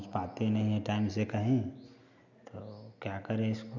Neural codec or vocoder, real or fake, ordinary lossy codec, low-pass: none; real; none; 7.2 kHz